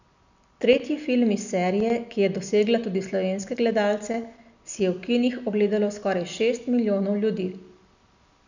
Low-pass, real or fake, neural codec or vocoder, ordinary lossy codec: 7.2 kHz; real; none; none